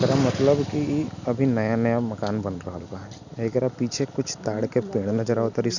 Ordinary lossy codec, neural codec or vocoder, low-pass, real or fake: none; none; 7.2 kHz; real